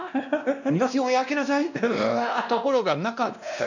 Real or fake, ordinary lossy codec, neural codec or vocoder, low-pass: fake; none; codec, 16 kHz, 1 kbps, X-Codec, WavLM features, trained on Multilingual LibriSpeech; 7.2 kHz